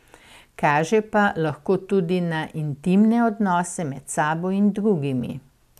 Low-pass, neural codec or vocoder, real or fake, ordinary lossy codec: 14.4 kHz; none; real; AAC, 96 kbps